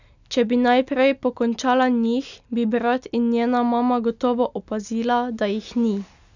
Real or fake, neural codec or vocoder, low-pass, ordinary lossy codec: real; none; 7.2 kHz; none